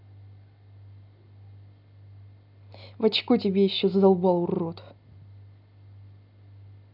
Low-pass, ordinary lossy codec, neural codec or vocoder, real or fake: 5.4 kHz; none; none; real